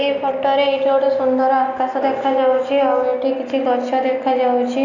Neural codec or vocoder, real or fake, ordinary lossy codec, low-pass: none; real; none; 7.2 kHz